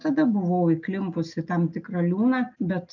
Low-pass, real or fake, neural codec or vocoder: 7.2 kHz; real; none